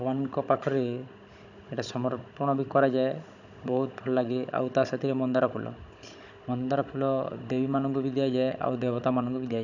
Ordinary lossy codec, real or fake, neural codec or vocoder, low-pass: none; fake; codec, 16 kHz, 16 kbps, FunCodec, trained on Chinese and English, 50 frames a second; 7.2 kHz